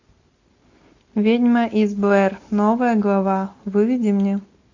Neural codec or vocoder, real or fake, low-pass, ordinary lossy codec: none; real; 7.2 kHz; AAC, 48 kbps